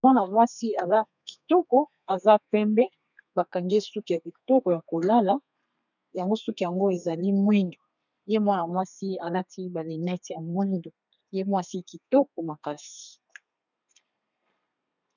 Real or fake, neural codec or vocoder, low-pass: fake; codec, 32 kHz, 1.9 kbps, SNAC; 7.2 kHz